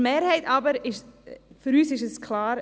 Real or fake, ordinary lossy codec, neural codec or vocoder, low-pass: real; none; none; none